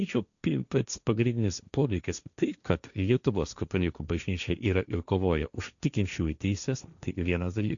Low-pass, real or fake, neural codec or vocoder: 7.2 kHz; fake; codec, 16 kHz, 1.1 kbps, Voila-Tokenizer